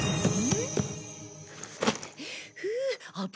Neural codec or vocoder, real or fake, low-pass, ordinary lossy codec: none; real; none; none